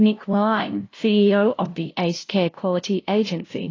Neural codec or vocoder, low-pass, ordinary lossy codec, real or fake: codec, 16 kHz, 0.5 kbps, FunCodec, trained on LibriTTS, 25 frames a second; 7.2 kHz; AAC, 32 kbps; fake